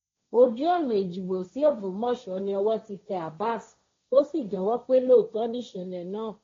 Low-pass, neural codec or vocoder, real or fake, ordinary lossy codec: 7.2 kHz; codec, 16 kHz, 1.1 kbps, Voila-Tokenizer; fake; AAC, 32 kbps